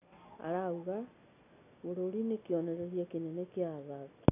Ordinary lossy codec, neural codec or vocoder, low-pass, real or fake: none; none; 3.6 kHz; real